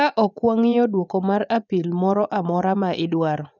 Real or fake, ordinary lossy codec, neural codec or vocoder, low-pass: fake; none; vocoder, 24 kHz, 100 mel bands, Vocos; 7.2 kHz